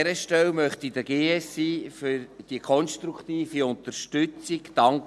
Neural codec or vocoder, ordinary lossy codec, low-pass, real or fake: none; none; none; real